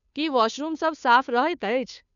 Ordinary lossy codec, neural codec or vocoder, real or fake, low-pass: none; codec, 16 kHz, 2 kbps, FunCodec, trained on Chinese and English, 25 frames a second; fake; 7.2 kHz